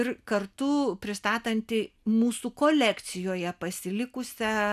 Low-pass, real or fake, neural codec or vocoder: 14.4 kHz; real; none